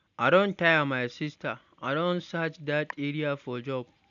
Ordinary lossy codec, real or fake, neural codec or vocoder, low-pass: none; real; none; 7.2 kHz